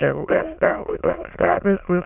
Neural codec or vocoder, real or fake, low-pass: autoencoder, 22.05 kHz, a latent of 192 numbers a frame, VITS, trained on many speakers; fake; 3.6 kHz